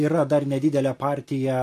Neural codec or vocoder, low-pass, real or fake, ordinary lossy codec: none; 14.4 kHz; real; MP3, 64 kbps